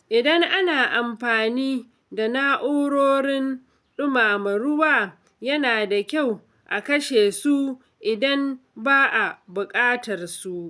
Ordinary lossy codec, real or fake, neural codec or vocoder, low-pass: none; real; none; none